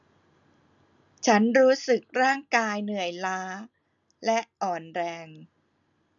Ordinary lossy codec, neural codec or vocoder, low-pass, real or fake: none; none; 7.2 kHz; real